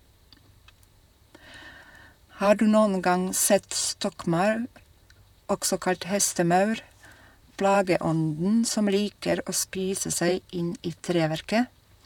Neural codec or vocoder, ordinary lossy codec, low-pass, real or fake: vocoder, 44.1 kHz, 128 mel bands, Pupu-Vocoder; none; 19.8 kHz; fake